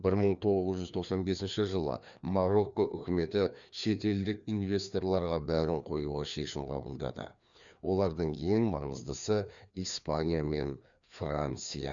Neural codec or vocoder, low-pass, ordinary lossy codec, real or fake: codec, 16 kHz, 2 kbps, FreqCodec, larger model; 7.2 kHz; none; fake